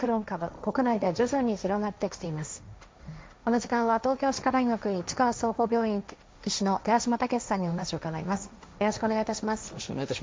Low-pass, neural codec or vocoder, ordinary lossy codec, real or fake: none; codec, 16 kHz, 1.1 kbps, Voila-Tokenizer; none; fake